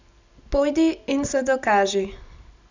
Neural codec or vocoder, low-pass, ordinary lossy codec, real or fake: none; 7.2 kHz; none; real